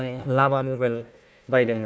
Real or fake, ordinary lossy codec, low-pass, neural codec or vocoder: fake; none; none; codec, 16 kHz, 1 kbps, FunCodec, trained on Chinese and English, 50 frames a second